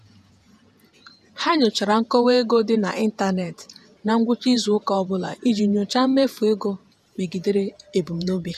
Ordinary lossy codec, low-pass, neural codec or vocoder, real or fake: none; 14.4 kHz; vocoder, 48 kHz, 128 mel bands, Vocos; fake